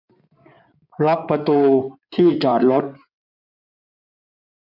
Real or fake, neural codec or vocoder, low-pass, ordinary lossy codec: fake; codec, 16 kHz in and 24 kHz out, 2.2 kbps, FireRedTTS-2 codec; 5.4 kHz; MP3, 48 kbps